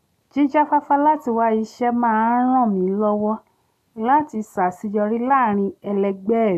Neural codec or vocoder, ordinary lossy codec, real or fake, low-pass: none; none; real; 14.4 kHz